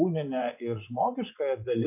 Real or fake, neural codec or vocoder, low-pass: fake; vocoder, 24 kHz, 100 mel bands, Vocos; 3.6 kHz